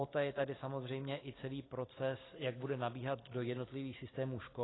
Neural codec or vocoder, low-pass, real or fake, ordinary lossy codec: none; 7.2 kHz; real; AAC, 16 kbps